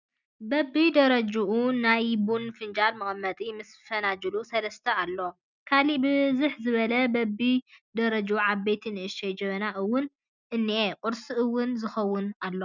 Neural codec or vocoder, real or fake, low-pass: none; real; 7.2 kHz